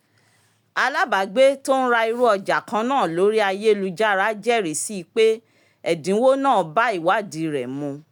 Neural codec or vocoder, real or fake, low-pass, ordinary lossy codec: none; real; 19.8 kHz; none